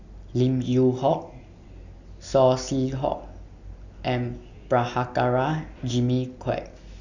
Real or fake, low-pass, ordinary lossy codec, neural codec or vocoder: real; 7.2 kHz; none; none